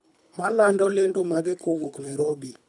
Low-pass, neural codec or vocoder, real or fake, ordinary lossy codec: 10.8 kHz; codec, 24 kHz, 3 kbps, HILCodec; fake; MP3, 96 kbps